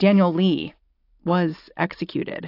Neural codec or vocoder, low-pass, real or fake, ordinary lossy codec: none; 5.4 kHz; real; AAC, 32 kbps